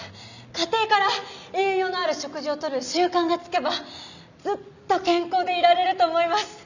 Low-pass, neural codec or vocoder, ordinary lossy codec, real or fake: 7.2 kHz; none; none; real